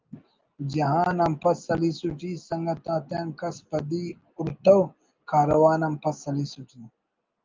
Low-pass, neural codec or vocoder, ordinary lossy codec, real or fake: 7.2 kHz; none; Opus, 24 kbps; real